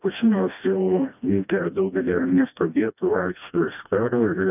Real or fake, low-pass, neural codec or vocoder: fake; 3.6 kHz; codec, 16 kHz, 1 kbps, FreqCodec, smaller model